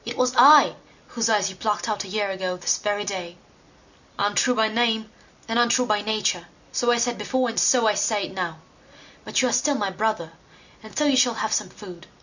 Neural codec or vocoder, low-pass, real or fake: none; 7.2 kHz; real